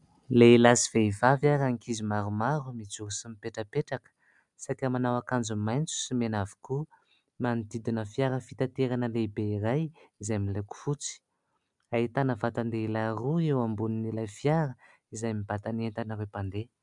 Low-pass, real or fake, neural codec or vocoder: 10.8 kHz; real; none